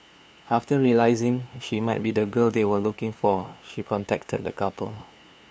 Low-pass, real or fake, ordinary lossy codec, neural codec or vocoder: none; fake; none; codec, 16 kHz, 2 kbps, FunCodec, trained on LibriTTS, 25 frames a second